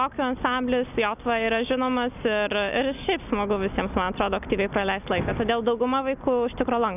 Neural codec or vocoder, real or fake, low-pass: none; real; 3.6 kHz